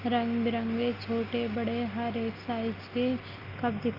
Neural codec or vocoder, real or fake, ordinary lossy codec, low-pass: none; real; Opus, 24 kbps; 5.4 kHz